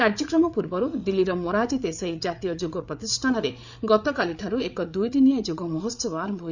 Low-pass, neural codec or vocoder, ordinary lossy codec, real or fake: 7.2 kHz; codec, 16 kHz, 8 kbps, FreqCodec, larger model; none; fake